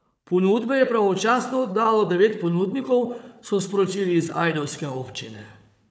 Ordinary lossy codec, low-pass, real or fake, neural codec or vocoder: none; none; fake; codec, 16 kHz, 4 kbps, FunCodec, trained on Chinese and English, 50 frames a second